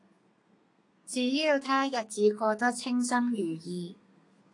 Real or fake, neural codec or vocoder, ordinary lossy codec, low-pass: fake; codec, 32 kHz, 1.9 kbps, SNAC; AAC, 48 kbps; 10.8 kHz